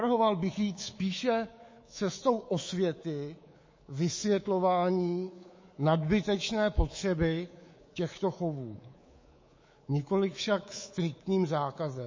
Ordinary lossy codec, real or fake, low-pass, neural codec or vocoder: MP3, 32 kbps; fake; 7.2 kHz; codec, 24 kHz, 3.1 kbps, DualCodec